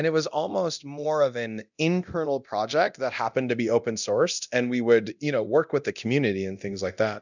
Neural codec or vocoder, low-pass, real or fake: codec, 24 kHz, 0.9 kbps, DualCodec; 7.2 kHz; fake